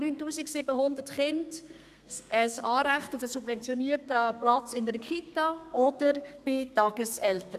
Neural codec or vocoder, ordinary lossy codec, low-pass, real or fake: codec, 32 kHz, 1.9 kbps, SNAC; none; 14.4 kHz; fake